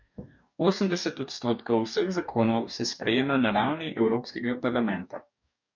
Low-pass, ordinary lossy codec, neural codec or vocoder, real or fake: 7.2 kHz; none; codec, 44.1 kHz, 2.6 kbps, DAC; fake